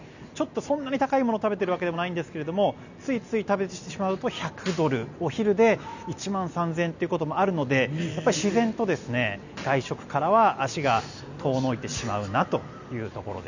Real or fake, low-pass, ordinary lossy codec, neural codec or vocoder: real; 7.2 kHz; none; none